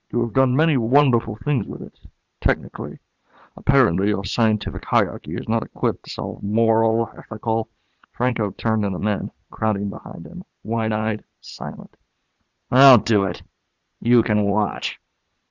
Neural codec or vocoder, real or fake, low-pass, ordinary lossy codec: vocoder, 22.05 kHz, 80 mel bands, Vocos; fake; 7.2 kHz; Opus, 64 kbps